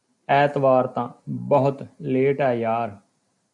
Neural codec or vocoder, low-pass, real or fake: none; 10.8 kHz; real